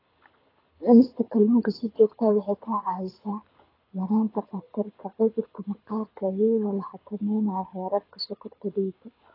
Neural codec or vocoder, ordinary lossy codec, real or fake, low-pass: codec, 24 kHz, 6 kbps, HILCodec; AAC, 24 kbps; fake; 5.4 kHz